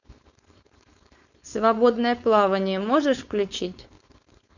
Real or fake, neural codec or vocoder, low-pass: fake; codec, 16 kHz, 4.8 kbps, FACodec; 7.2 kHz